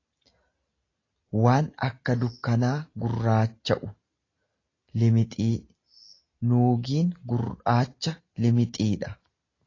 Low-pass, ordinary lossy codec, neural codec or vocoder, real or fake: 7.2 kHz; AAC, 32 kbps; none; real